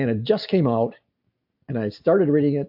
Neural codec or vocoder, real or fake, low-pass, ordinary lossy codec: none; real; 5.4 kHz; AAC, 48 kbps